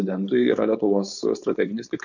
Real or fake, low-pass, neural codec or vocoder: fake; 7.2 kHz; codec, 16 kHz, 4.8 kbps, FACodec